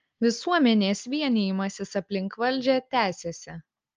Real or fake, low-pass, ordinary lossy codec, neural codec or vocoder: real; 7.2 kHz; Opus, 24 kbps; none